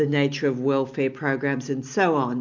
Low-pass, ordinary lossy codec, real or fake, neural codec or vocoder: 7.2 kHz; MP3, 64 kbps; real; none